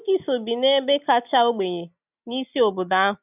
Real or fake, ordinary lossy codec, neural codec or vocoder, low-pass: real; none; none; 3.6 kHz